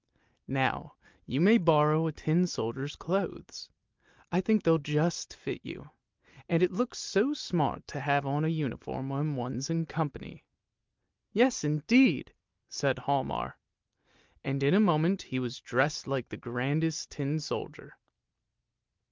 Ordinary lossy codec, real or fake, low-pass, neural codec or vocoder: Opus, 24 kbps; real; 7.2 kHz; none